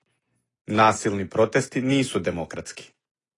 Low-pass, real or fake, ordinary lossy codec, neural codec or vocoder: 10.8 kHz; real; AAC, 32 kbps; none